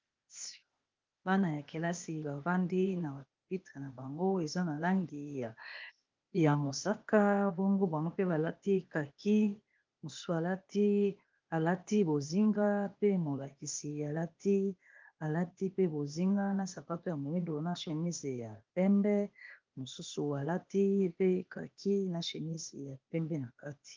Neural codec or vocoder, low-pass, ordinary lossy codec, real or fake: codec, 16 kHz, 0.8 kbps, ZipCodec; 7.2 kHz; Opus, 24 kbps; fake